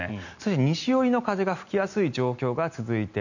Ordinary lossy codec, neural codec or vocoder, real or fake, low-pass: none; none; real; 7.2 kHz